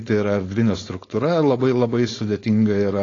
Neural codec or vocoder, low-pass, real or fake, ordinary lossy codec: codec, 16 kHz, 4.8 kbps, FACodec; 7.2 kHz; fake; AAC, 32 kbps